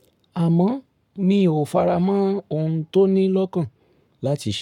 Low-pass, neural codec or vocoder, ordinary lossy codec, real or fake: 19.8 kHz; codec, 44.1 kHz, 7.8 kbps, Pupu-Codec; MP3, 96 kbps; fake